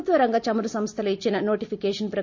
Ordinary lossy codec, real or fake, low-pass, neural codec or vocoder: MP3, 48 kbps; real; 7.2 kHz; none